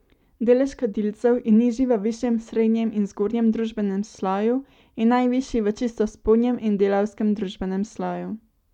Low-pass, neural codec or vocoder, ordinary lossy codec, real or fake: 19.8 kHz; none; none; real